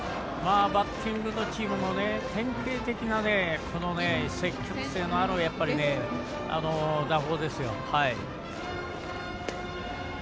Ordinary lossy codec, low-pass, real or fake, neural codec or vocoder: none; none; real; none